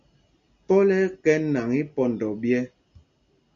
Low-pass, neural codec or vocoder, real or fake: 7.2 kHz; none; real